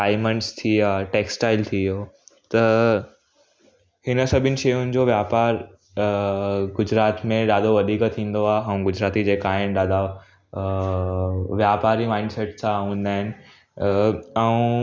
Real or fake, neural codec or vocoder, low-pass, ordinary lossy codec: real; none; none; none